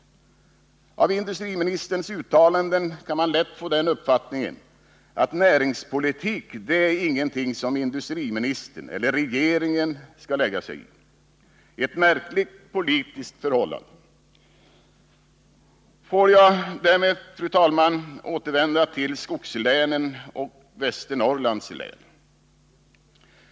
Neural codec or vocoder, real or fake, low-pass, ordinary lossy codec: none; real; none; none